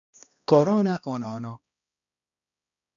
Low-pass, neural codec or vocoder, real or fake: 7.2 kHz; codec, 16 kHz, 1 kbps, X-Codec, HuBERT features, trained on balanced general audio; fake